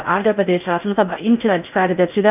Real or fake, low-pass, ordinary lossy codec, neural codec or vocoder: fake; 3.6 kHz; none; codec, 16 kHz in and 24 kHz out, 0.6 kbps, FocalCodec, streaming, 4096 codes